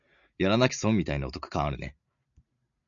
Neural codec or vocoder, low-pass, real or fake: none; 7.2 kHz; real